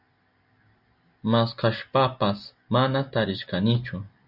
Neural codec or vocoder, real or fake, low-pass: none; real; 5.4 kHz